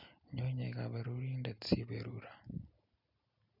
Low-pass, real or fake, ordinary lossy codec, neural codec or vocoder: 5.4 kHz; real; none; none